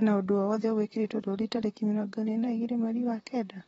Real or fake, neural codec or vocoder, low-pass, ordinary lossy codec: fake; vocoder, 44.1 kHz, 128 mel bands, Pupu-Vocoder; 19.8 kHz; AAC, 24 kbps